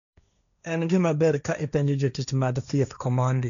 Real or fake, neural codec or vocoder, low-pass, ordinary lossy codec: fake; codec, 16 kHz, 1.1 kbps, Voila-Tokenizer; 7.2 kHz; none